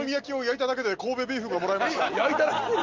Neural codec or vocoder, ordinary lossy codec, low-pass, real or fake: none; Opus, 32 kbps; 7.2 kHz; real